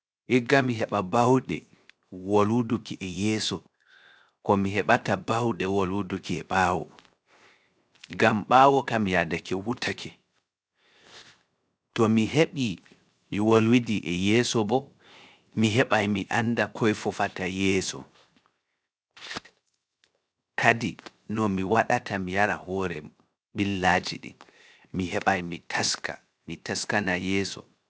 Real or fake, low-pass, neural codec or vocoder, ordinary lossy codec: fake; none; codec, 16 kHz, 0.7 kbps, FocalCodec; none